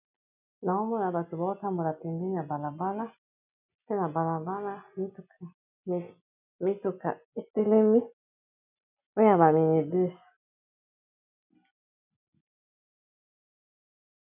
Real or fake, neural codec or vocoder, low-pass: real; none; 3.6 kHz